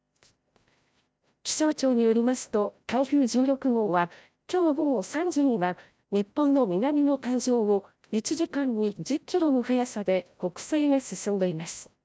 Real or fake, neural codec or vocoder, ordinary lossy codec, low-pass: fake; codec, 16 kHz, 0.5 kbps, FreqCodec, larger model; none; none